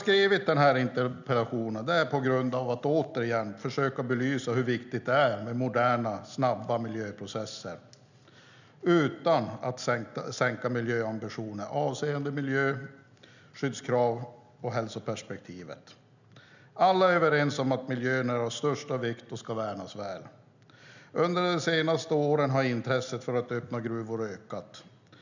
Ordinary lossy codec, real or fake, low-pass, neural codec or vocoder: none; real; 7.2 kHz; none